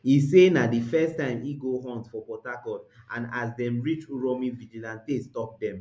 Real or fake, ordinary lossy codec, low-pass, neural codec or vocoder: real; none; none; none